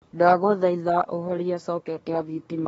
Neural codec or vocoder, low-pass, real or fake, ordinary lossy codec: codec, 24 kHz, 1 kbps, SNAC; 10.8 kHz; fake; AAC, 24 kbps